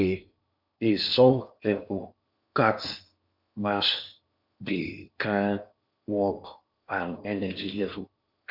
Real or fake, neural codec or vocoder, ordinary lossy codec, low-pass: fake; codec, 16 kHz in and 24 kHz out, 0.8 kbps, FocalCodec, streaming, 65536 codes; none; 5.4 kHz